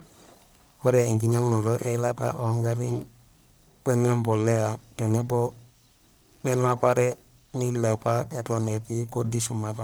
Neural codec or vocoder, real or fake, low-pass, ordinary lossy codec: codec, 44.1 kHz, 1.7 kbps, Pupu-Codec; fake; none; none